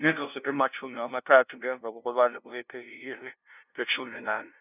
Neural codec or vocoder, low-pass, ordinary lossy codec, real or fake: codec, 16 kHz, 0.5 kbps, FunCodec, trained on LibriTTS, 25 frames a second; 3.6 kHz; none; fake